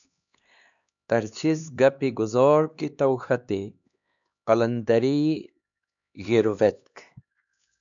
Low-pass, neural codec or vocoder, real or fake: 7.2 kHz; codec, 16 kHz, 2 kbps, X-Codec, HuBERT features, trained on LibriSpeech; fake